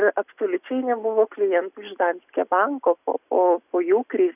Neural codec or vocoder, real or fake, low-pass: none; real; 3.6 kHz